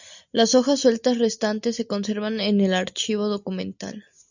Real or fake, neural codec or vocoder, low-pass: real; none; 7.2 kHz